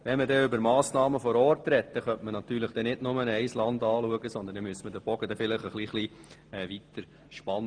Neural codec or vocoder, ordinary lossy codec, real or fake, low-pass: none; Opus, 24 kbps; real; 9.9 kHz